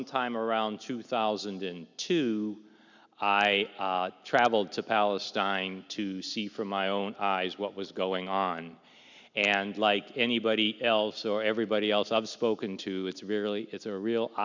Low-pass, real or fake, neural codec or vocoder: 7.2 kHz; real; none